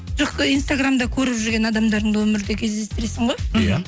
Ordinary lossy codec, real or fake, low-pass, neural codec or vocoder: none; real; none; none